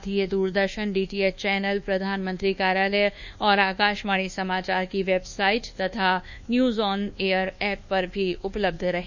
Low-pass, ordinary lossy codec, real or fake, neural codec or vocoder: 7.2 kHz; none; fake; codec, 24 kHz, 1.2 kbps, DualCodec